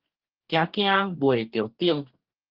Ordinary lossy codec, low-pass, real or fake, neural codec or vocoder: Opus, 32 kbps; 5.4 kHz; fake; codec, 44.1 kHz, 2.6 kbps, DAC